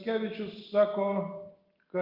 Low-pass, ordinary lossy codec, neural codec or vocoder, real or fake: 5.4 kHz; Opus, 32 kbps; none; real